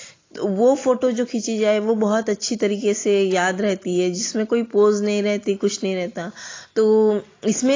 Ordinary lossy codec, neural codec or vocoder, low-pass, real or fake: AAC, 32 kbps; none; 7.2 kHz; real